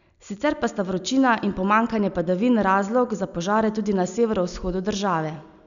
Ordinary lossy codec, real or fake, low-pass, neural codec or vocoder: none; real; 7.2 kHz; none